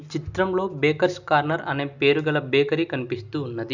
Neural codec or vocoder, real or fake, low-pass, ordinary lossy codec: none; real; 7.2 kHz; none